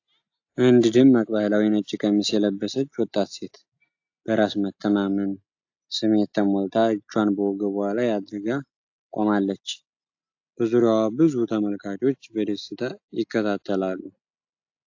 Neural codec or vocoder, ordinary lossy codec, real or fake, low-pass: none; AAC, 48 kbps; real; 7.2 kHz